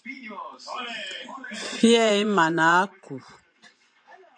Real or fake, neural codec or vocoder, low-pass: fake; vocoder, 24 kHz, 100 mel bands, Vocos; 9.9 kHz